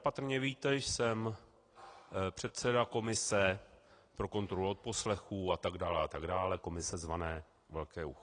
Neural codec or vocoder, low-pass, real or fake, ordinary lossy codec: none; 9.9 kHz; real; AAC, 32 kbps